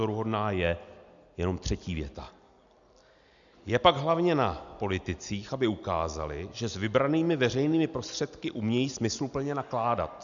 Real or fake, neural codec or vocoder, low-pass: real; none; 7.2 kHz